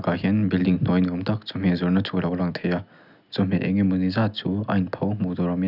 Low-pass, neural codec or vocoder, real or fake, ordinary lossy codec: 5.4 kHz; none; real; none